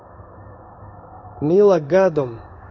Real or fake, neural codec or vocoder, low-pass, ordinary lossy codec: fake; codec, 16 kHz in and 24 kHz out, 1 kbps, XY-Tokenizer; 7.2 kHz; none